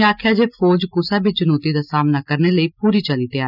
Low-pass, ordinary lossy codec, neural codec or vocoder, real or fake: 5.4 kHz; none; none; real